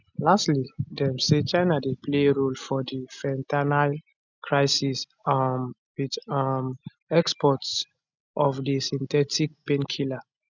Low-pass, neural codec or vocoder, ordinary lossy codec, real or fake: 7.2 kHz; none; none; real